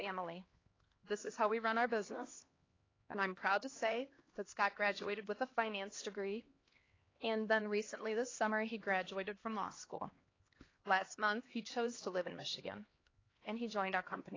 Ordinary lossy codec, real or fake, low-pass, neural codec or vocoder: AAC, 32 kbps; fake; 7.2 kHz; codec, 16 kHz, 2 kbps, X-Codec, HuBERT features, trained on LibriSpeech